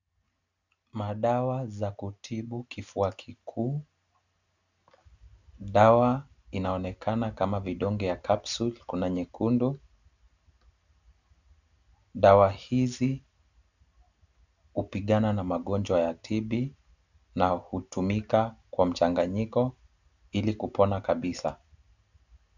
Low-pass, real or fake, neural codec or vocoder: 7.2 kHz; real; none